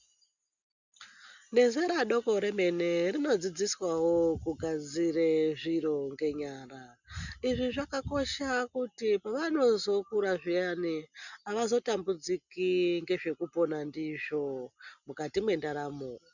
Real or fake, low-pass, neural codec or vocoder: real; 7.2 kHz; none